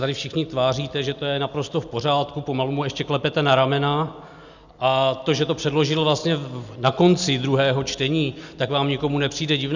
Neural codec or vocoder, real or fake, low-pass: none; real; 7.2 kHz